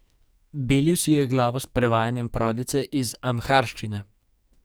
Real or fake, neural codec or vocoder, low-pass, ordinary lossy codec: fake; codec, 44.1 kHz, 2.6 kbps, SNAC; none; none